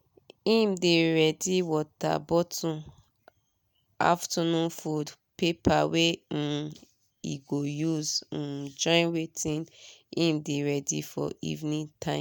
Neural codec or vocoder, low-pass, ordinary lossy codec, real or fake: none; none; none; real